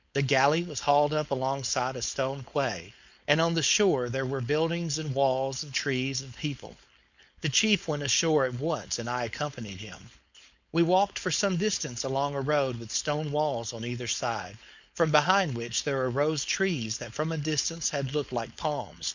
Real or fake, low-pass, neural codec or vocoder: fake; 7.2 kHz; codec, 16 kHz, 4.8 kbps, FACodec